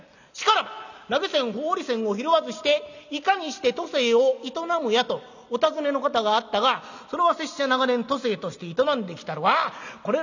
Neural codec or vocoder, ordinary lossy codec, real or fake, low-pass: none; none; real; 7.2 kHz